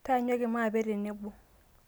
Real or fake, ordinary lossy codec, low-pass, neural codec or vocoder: real; none; none; none